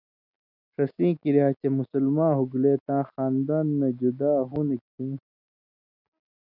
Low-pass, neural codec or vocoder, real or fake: 5.4 kHz; none; real